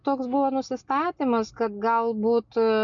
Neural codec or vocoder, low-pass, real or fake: none; 7.2 kHz; real